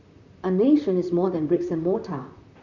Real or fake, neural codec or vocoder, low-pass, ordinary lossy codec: fake; vocoder, 44.1 kHz, 128 mel bands, Pupu-Vocoder; 7.2 kHz; none